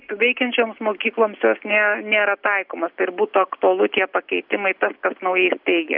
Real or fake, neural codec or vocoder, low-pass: real; none; 5.4 kHz